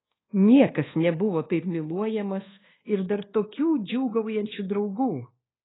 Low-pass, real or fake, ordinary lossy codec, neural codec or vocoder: 7.2 kHz; fake; AAC, 16 kbps; codec, 24 kHz, 1.2 kbps, DualCodec